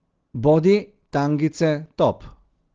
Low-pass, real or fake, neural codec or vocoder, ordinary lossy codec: 7.2 kHz; real; none; Opus, 16 kbps